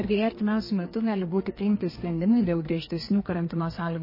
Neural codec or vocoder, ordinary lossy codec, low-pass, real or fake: codec, 32 kHz, 1.9 kbps, SNAC; MP3, 24 kbps; 5.4 kHz; fake